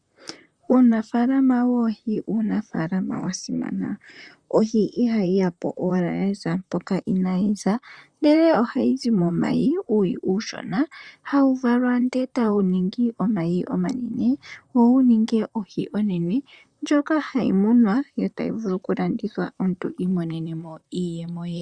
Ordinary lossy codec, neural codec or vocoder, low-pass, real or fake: Opus, 64 kbps; vocoder, 44.1 kHz, 128 mel bands, Pupu-Vocoder; 9.9 kHz; fake